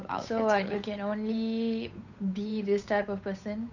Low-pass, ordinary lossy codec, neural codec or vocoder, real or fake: 7.2 kHz; none; codec, 16 kHz, 8 kbps, FunCodec, trained on Chinese and English, 25 frames a second; fake